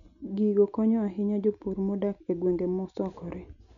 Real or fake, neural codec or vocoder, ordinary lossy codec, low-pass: real; none; none; 7.2 kHz